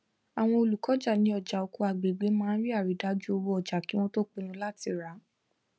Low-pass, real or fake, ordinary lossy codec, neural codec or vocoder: none; real; none; none